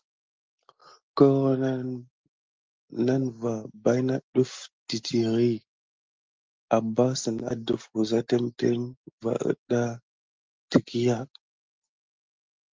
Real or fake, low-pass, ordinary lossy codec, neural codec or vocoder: real; 7.2 kHz; Opus, 32 kbps; none